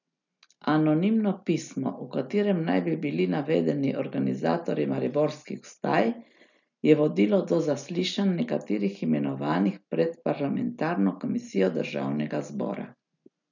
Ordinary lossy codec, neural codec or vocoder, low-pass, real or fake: none; none; 7.2 kHz; real